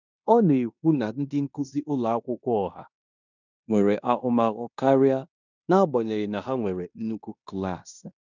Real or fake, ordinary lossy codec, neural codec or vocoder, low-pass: fake; none; codec, 16 kHz in and 24 kHz out, 0.9 kbps, LongCat-Audio-Codec, fine tuned four codebook decoder; 7.2 kHz